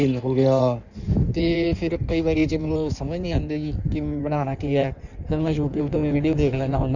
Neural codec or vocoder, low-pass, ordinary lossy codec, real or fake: codec, 16 kHz in and 24 kHz out, 1.1 kbps, FireRedTTS-2 codec; 7.2 kHz; none; fake